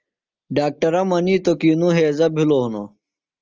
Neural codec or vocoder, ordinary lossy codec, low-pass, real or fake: none; Opus, 24 kbps; 7.2 kHz; real